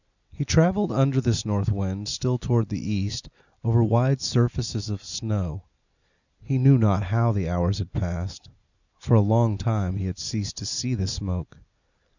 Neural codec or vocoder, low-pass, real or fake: none; 7.2 kHz; real